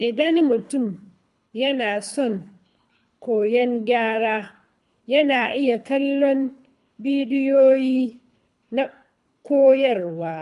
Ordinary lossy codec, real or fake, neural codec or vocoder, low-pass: none; fake; codec, 24 kHz, 3 kbps, HILCodec; 10.8 kHz